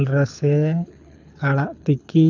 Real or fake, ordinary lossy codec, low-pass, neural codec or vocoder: fake; none; 7.2 kHz; codec, 24 kHz, 6 kbps, HILCodec